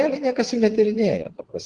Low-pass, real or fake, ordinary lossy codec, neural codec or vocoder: 9.9 kHz; fake; Opus, 16 kbps; vocoder, 22.05 kHz, 80 mel bands, WaveNeXt